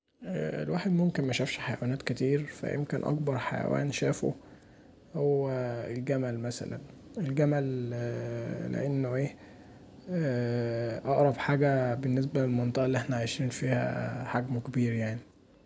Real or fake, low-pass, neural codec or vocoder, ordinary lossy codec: real; none; none; none